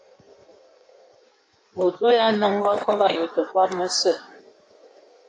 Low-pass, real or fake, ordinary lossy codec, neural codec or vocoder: 9.9 kHz; fake; AAC, 48 kbps; codec, 16 kHz in and 24 kHz out, 1.1 kbps, FireRedTTS-2 codec